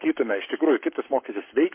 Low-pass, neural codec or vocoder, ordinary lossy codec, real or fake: 3.6 kHz; codec, 44.1 kHz, 7.8 kbps, Pupu-Codec; MP3, 24 kbps; fake